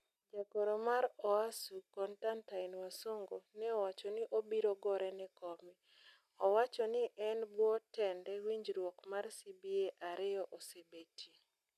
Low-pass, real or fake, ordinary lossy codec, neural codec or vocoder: 14.4 kHz; real; none; none